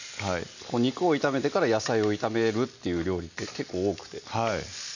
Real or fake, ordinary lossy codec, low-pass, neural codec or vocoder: real; none; 7.2 kHz; none